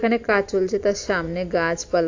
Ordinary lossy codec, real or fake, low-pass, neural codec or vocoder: AAC, 48 kbps; real; 7.2 kHz; none